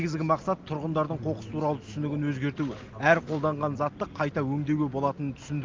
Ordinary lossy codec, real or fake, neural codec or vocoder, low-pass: Opus, 32 kbps; real; none; 7.2 kHz